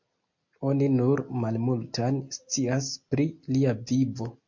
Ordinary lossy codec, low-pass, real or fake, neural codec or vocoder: MP3, 48 kbps; 7.2 kHz; real; none